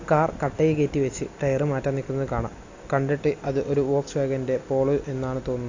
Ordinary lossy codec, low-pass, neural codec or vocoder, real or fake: AAC, 48 kbps; 7.2 kHz; none; real